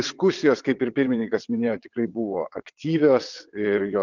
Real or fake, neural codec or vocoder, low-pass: fake; vocoder, 22.05 kHz, 80 mel bands, WaveNeXt; 7.2 kHz